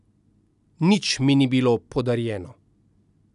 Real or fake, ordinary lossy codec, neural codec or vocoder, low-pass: real; none; none; 10.8 kHz